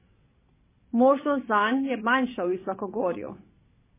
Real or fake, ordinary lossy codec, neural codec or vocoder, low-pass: fake; MP3, 16 kbps; vocoder, 22.05 kHz, 80 mel bands, WaveNeXt; 3.6 kHz